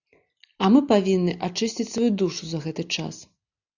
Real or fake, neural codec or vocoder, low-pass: real; none; 7.2 kHz